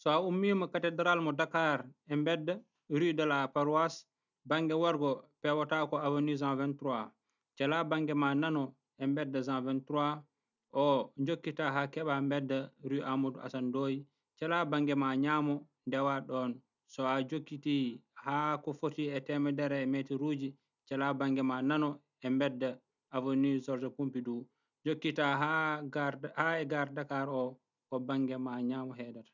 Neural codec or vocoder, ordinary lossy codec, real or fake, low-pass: none; none; real; 7.2 kHz